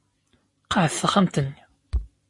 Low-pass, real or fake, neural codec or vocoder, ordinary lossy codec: 10.8 kHz; real; none; MP3, 48 kbps